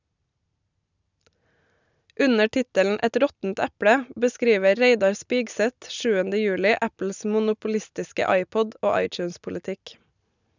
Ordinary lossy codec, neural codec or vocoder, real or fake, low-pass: none; none; real; 7.2 kHz